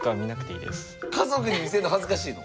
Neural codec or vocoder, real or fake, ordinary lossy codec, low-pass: none; real; none; none